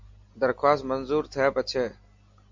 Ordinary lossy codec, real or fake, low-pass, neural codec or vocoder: MP3, 64 kbps; real; 7.2 kHz; none